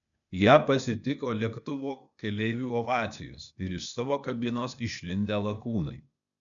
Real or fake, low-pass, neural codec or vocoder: fake; 7.2 kHz; codec, 16 kHz, 0.8 kbps, ZipCodec